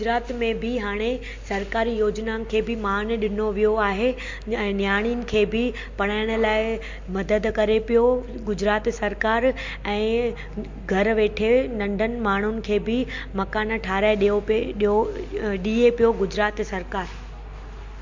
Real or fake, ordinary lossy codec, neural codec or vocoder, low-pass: real; MP3, 48 kbps; none; 7.2 kHz